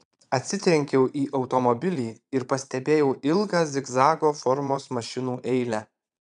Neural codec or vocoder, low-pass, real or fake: vocoder, 22.05 kHz, 80 mel bands, Vocos; 9.9 kHz; fake